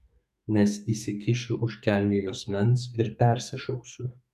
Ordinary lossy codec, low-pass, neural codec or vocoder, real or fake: AAC, 96 kbps; 14.4 kHz; codec, 32 kHz, 1.9 kbps, SNAC; fake